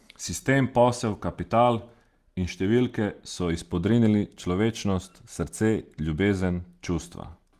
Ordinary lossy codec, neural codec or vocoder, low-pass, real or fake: Opus, 24 kbps; none; 14.4 kHz; real